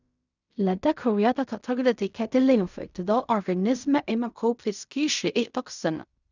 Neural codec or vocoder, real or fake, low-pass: codec, 16 kHz in and 24 kHz out, 0.4 kbps, LongCat-Audio-Codec, fine tuned four codebook decoder; fake; 7.2 kHz